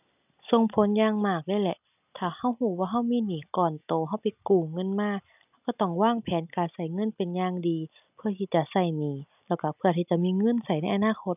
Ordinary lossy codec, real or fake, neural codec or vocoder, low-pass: none; real; none; 3.6 kHz